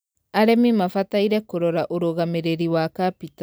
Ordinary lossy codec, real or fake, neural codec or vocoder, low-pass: none; real; none; none